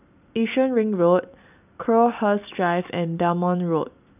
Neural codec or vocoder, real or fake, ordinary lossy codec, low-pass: none; real; none; 3.6 kHz